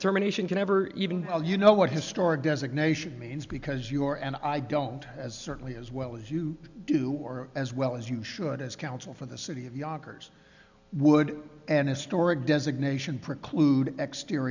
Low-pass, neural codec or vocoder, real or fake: 7.2 kHz; none; real